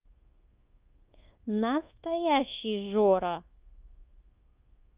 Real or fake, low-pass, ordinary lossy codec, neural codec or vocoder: fake; 3.6 kHz; Opus, 32 kbps; codec, 24 kHz, 1.2 kbps, DualCodec